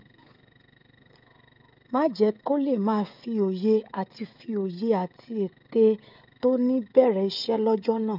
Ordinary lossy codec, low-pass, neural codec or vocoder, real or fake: none; 5.4 kHz; codec, 16 kHz, 16 kbps, FreqCodec, smaller model; fake